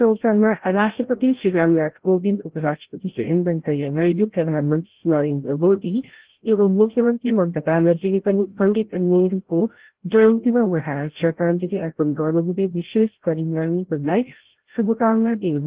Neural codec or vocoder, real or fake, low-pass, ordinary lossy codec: codec, 16 kHz, 0.5 kbps, FreqCodec, larger model; fake; 3.6 kHz; Opus, 16 kbps